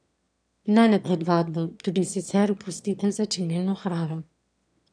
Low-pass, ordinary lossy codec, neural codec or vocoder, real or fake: 9.9 kHz; none; autoencoder, 22.05 kHz, a latent of 192 numbers a frame, VITS, trained on one speaker; fake